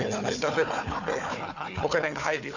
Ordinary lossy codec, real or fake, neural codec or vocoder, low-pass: none; fake; codec, 16 kHz, 4 kbps, FunCodec, trained on Chinese and English, 50 frames a second; 7.2 kHz